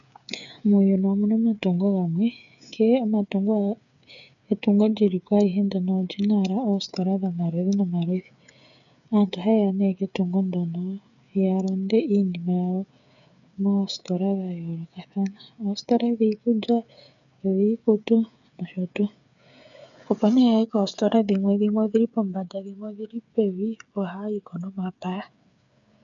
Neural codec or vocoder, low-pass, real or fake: codec, 16 kHz, 8 kbps, FreqCodec, smaller model; 7.2 kHz; fake